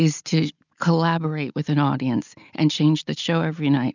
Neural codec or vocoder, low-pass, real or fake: none; 7.2 kHz; real